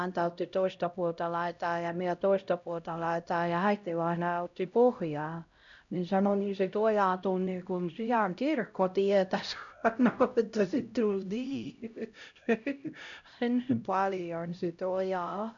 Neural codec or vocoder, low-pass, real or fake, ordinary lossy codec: codec, 16 kHz, 0.5 kbps, X-Codec, HuBERT features, trained on LibriSpeech; 7.2 kHz; fake; none